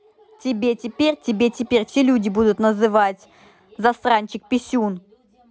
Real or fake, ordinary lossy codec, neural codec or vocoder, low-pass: real; none; none; none